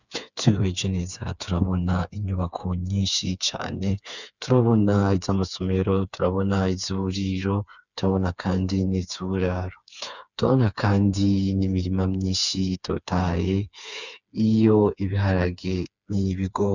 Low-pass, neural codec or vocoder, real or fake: 7.2 kHz; codec, 16 kHz, 4 kbps, FreqCodec, smaller model; fake